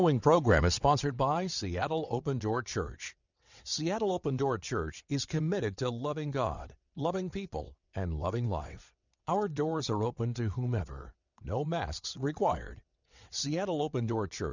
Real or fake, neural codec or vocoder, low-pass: fake; vocoder, 22.05 kHz, 80 mel bands, WaveNeXt; 7.2 kHz